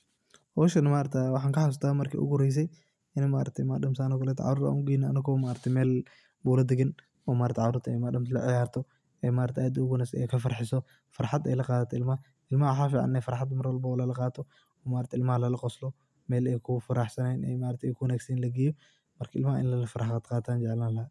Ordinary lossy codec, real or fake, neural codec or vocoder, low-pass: none; real; none; none